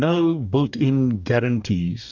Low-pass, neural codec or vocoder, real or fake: 7.2 kHz; codec, 44.1 kHz, 2.6 kbps, DAC; fake